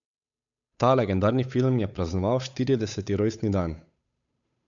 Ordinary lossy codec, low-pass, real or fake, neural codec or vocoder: MP3, 96 kbps; 7.2 kHz; fake; codec, 16 kHz, 8 kbps, FunCodec, trained on Chinese and English, 25 frames a second